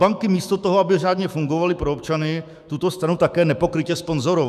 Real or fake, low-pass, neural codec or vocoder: fake; 14.4 kHz; autoencoder, 48 kHz, 128 numbers a frame, DAC-VAE, trained on Japanese speech